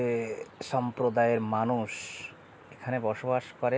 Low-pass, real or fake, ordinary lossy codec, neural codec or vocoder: none; real; none; none